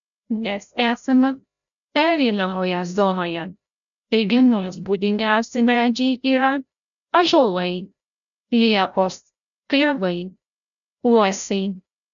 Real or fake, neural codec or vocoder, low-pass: fake; codec, 16 kHz, 0.5 kbps, FreqCodec, larger model; 7.2 kHz